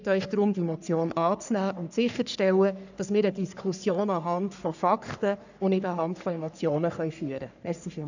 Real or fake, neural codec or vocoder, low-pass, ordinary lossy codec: fake; codec, 44.1 kHz, 3.4 kbps, Pupu-Codec; 7.2 kHz; none